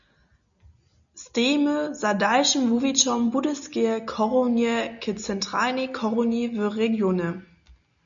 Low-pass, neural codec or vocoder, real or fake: 7.2 kHz; none; real